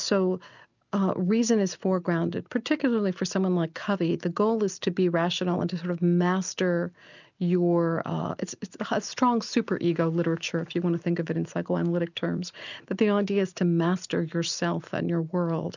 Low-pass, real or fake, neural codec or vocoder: 7.2 kHz; real; none